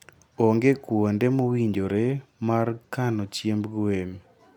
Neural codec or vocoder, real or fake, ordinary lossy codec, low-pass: none; real; none; 19.8 kHz